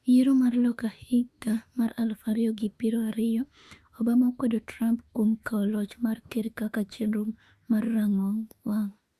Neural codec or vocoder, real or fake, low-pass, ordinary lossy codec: autoencoder, 48 kHz, 32 numbers a frame, DAC-VAE, trained on Japanese speech; fake; 14.4 kHz; Opus, 64 kbps